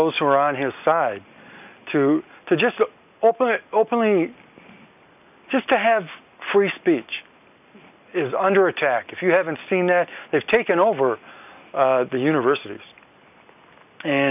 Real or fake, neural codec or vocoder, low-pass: real; none; 3.6 kHz